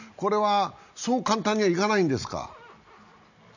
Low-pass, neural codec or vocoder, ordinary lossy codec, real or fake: 7.2 kHz; none; none; real